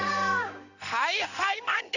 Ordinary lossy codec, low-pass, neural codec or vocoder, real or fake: none; 7.2 kHz; codec, 16 kHz in and 24 kHz out, 1 kbps, XY-Tokenizer; fake